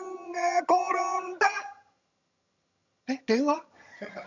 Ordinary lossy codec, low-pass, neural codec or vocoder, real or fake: none; 7.2 kHz; vocoder, 22.05 kHz, 80 mel bands, HiFi-GAN; fake